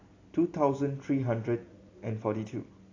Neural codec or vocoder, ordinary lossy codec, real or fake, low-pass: none; AAC, 32 kbps; real; 7.2 kHz